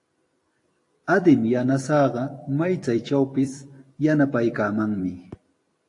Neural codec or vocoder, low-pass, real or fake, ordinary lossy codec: vocoder, 44.1 kHz, 128 mel bands every 256 samples, BigVGAN v2; 10.8 kHz; fake; AAC, 48 kbps